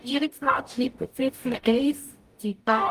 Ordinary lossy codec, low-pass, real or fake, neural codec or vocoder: Opus, 32 kbps; 14.4 kHz; fake; codec, 44.1 kHz, 0.9 kbps, DAC